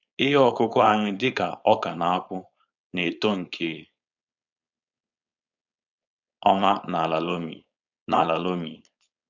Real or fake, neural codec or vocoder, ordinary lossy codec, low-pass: fake; codec, 16 kHz, 4.8 kbps, FACodec; none; 7.2 kHz